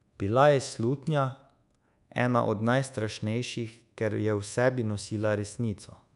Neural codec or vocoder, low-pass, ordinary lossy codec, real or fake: codec, 24 kHz, 1.2 kbps, DualCodec; 10.8 kHz; none; fake